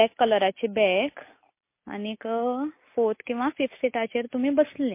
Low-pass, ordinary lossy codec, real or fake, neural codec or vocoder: 3.6 kHz; MP3, 24 kbps; real; none